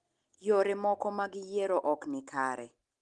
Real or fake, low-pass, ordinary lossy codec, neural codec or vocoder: real; 10.8 kHz; Opus, 24 kbps; none